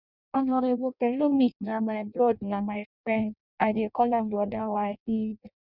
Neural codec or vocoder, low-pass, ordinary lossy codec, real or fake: codec, 16 kHz in and 24 kHz out, 0.6 kbps, FireRedTTS-2 codec; 5.4 kHz; none; fake